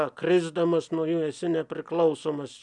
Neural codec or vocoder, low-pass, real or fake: none; 10.8 kHz; real